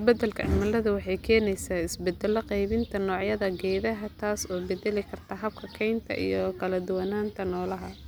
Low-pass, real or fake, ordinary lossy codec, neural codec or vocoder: none; real; none; none